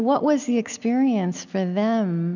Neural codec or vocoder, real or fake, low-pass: none; real; 7.2 kHz